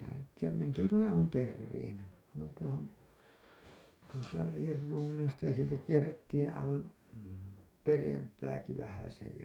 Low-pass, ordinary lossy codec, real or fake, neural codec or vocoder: 19.8 kHz; none; fake; codec, 44.1 kHz, 2.6 kbps, DAC